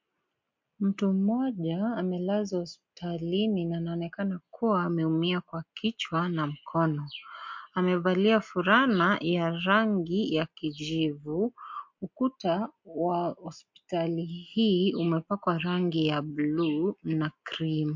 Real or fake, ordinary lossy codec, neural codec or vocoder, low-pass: real; MP3, 48 kbps; none; 7.2 kHz